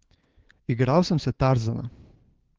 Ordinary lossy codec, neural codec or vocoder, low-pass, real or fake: Opus, 16 kbps; codec, 16 kHz, 6 kbps, DAC; 7.2 kHz; fake